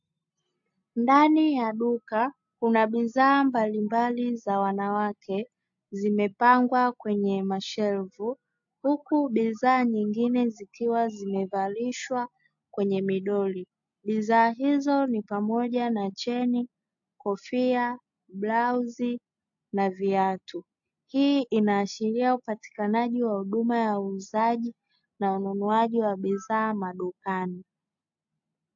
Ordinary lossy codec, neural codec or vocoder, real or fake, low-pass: AAC, 64 kbps; none; real; 7.2 kHz